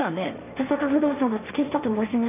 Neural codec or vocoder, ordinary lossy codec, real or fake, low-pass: codec, 16 kHz, 1.1 kbps, Voila-Tokenizer; none; fake; 3.6 kHz